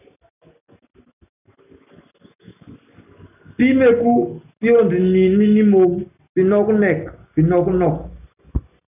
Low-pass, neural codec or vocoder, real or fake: 3.6 kHz; none; real